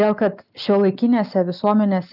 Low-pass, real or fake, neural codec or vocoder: 5.4 kHz; real; none